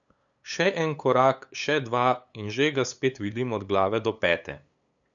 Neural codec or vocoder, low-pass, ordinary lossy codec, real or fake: codec, 16 kHz, 8 kbps, FunCodec, trained on LibriTTS, 25 frames a second; 7.2 kHz; none; fake